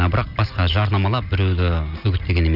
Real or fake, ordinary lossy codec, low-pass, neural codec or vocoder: real; none; 5.4 kHz; none